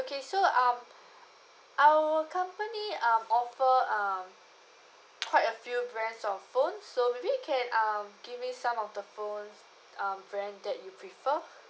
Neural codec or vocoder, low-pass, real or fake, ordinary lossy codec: none; none; real; none